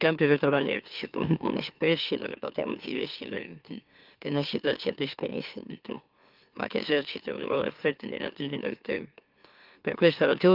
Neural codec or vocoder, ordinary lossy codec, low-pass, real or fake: autoencoder, 44.1 kHz, a latent of 192 numbers a frame, MeloTTS; Opus, 32 kbps; 5.4 kHz; fake